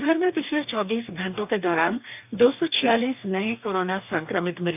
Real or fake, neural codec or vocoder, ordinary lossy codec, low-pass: fake; codec, 44.1 kHz, 2.6 kbps, DAC; none; 3.6 kHz